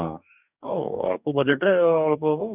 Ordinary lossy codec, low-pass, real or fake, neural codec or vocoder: none; 3.6 kHz; fake; codec, 44.1 kHz, 2.6 kbps, DAC